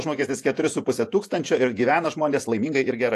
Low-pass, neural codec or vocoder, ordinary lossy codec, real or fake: 14.4 kHz; vocoder, 48 kHz, 128 mel bands, Vocos; AAC, 64 kbps; fake